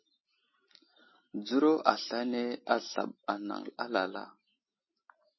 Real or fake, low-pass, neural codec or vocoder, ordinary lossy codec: real; 7.2 kHz; none; MP3, 24 kbps